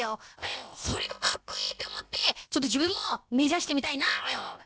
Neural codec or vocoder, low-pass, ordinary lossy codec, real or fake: codec, 16 kHz, about 1 kbps, DyCAST, with the encoder's durations; none; none; fake